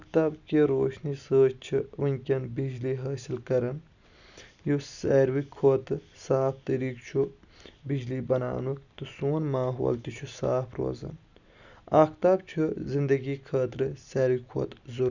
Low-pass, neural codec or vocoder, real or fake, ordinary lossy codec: 7.2 kHz; none; real; none